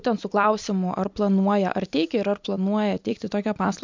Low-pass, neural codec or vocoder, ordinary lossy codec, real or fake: 7.2 kHz; vocoder, 44.1 kHz, 128 mel bands every 256 samples, BigVGAN v2; MP3, 64 kbps; fake